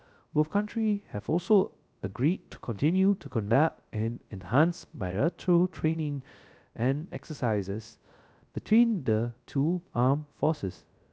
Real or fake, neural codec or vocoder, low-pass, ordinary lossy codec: fake; codec, 16 kHz, 0.3 kbps, FocalCodec; none; none